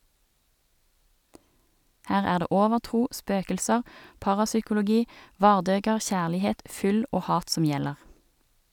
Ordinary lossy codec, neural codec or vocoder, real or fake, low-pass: none; none; real; 19.8 kHz